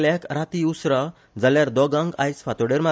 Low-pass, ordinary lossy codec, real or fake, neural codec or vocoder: none; none; real; none